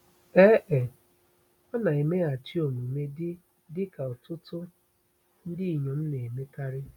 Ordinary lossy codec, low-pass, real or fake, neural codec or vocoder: none; 19.8 kHz; real; none